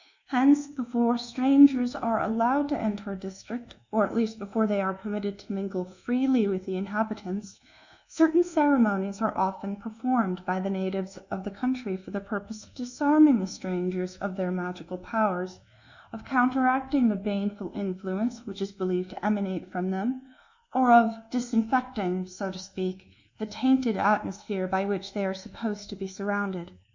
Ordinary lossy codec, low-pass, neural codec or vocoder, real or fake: Opus, 64 kbps; 7.2 kHz; codec, 24 kHz, 1.2 kbps, DualCodec; fake